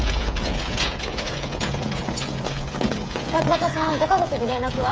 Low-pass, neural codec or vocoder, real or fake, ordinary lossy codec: none; codec, 16 kHz, 16 kbps, FreqCodec, smaller model; fake; none